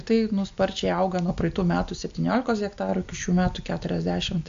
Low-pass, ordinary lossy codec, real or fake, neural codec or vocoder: 7.2 kHz; AAC, 64 kbps; real; none